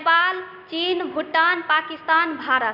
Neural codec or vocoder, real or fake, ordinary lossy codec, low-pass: none; real; none; 5.4 kHz